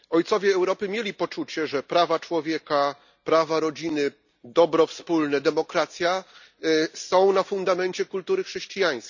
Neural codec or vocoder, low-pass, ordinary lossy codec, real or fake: none; 7.2 kHz; none; real